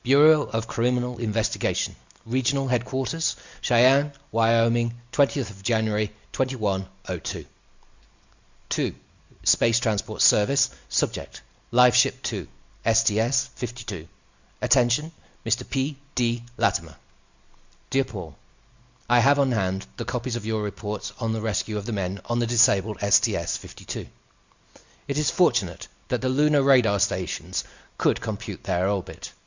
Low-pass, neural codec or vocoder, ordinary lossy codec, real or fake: 7.2 kHz; none; Opus, 64 kbps; real